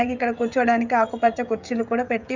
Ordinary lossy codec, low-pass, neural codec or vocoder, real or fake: none; 7.2 kHz; codec, 16 kHz, 16 kbps, FreqCodec, smaller model; fake